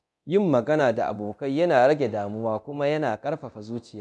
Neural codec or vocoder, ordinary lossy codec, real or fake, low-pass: codec, 24 kHz, 0.9 kbps, DualCodec; none; fake; none